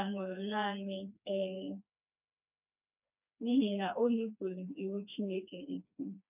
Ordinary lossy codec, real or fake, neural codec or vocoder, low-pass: none; fake; codec, 16 kHz, 2 kbps, FreqCodec, smaller model; 3.6 kHz